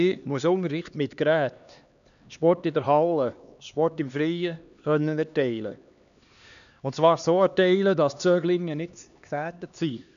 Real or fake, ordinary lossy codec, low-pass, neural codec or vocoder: fake; none; 7.2 kHz; codec, 16 kHz, 2 kbps, X-Codec, HuBERT features, trained on LibriSpeech